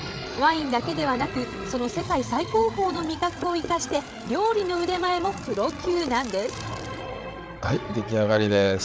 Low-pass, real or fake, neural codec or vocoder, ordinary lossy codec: none; fake; codec, 16 kHz, 8 kbps, FreqCodec, larger model; none